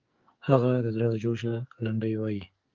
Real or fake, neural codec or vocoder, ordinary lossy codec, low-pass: fake; codec, 44.1 kHz, 2.6 kbps, SNAC; Opus, 32 kbps; 7.2 kHz